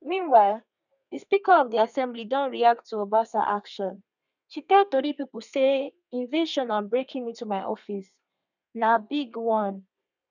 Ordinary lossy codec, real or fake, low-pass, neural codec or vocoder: none; fake; 7.2 kHz; codec, 32 kHz, 1.9 kbps, SNAC